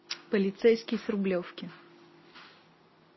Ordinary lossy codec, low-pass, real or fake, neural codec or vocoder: MP3, 24 kbps; 7.2 kHz; real; none